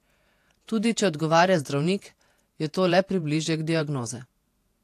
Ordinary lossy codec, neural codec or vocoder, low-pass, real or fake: AAC, 64 kbps; vocoder, 48 kHz, 128 mel bands, Vocos; 14.4 kHz; fake